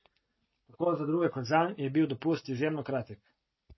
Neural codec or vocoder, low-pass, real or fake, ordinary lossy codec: none; 7.2 kHz; real; MP3, 24 kbps